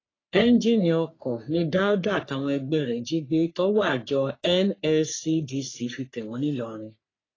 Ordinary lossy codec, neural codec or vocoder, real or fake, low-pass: AAC, 32 kbps; codec, 44.1 kHz, 3.4 kbps, Pupu-Codec; fake; 7.2 kHz